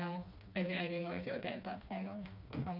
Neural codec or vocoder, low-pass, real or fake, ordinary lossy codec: codec, 16 kHz, 2 kbps, FreqCodec, smaller model; 5.4 kHz; fake; none